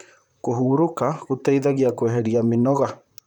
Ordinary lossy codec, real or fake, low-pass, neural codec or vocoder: none; fake; 19.8 kHz; vocoder, 44.1 kHz, 128 mel bands, Pupu-Vocoder